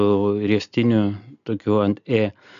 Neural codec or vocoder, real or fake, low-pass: none; real; 7.2 kHz